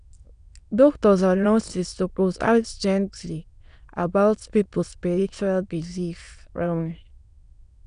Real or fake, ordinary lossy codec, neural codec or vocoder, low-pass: fake; none; autoencoder, 22.05 kHz, a latent of 192 numbers a frame, VITS, trained on many speakers; 9.9 kHz